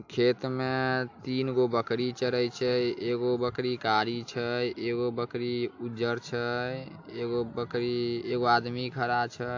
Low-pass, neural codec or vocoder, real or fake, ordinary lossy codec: 7.2 kHz; none; real; AAC, 48 kbps